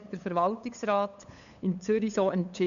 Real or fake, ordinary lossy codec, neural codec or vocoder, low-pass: fake; none; codec, 16 kHz, 8 kbps, FunCodec, trained on LibriTTS, 25 frames a second; 7.2 kHz